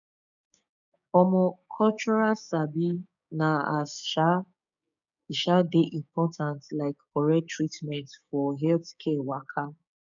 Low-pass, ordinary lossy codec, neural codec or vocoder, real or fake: 7.2 kHz; none; codec, 16 kHz, 6 kbps, DAC; fake